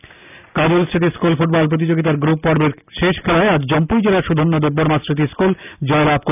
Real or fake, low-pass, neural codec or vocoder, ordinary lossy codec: real; 3.6 kHz; none; none